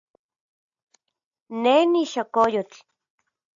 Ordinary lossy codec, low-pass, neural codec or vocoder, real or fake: AAC, 64 kbps; 7.2 kHz; none; real